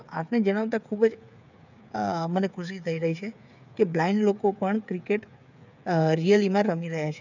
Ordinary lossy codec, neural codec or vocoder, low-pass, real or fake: none; codec, 16 kHz, 8 kbps, FreqCodec, smaller model; 7.2 kHz; fake